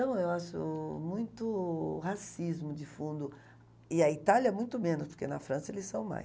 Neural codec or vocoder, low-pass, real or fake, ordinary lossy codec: none; none; real; none